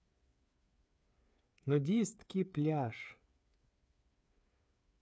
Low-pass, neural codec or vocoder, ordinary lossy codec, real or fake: none; codec, 16 kHz, 8 kbps, FreqCodec, smaller model; none; fake